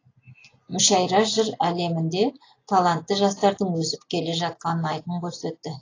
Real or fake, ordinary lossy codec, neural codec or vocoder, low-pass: real; AAC, 32 kbps; none; 7.2 kHz